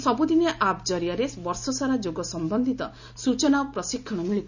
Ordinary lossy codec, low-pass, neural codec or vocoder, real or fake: none; 7.2 kHz; none; real